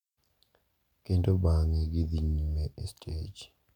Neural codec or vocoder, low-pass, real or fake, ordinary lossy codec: none; 19.8 kHz; real; none